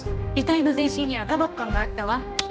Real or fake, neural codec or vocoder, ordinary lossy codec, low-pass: fake; codec, 16 kHz, 1 kbps, X-Codec, HuBERT features, trained on general audio; none; none